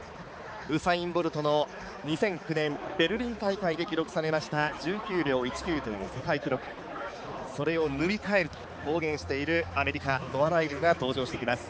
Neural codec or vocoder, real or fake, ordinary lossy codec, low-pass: codec, 16 kHz, 4 kbps, X-Codec, HuBERT features, trained on balanced general audio; fake; none; none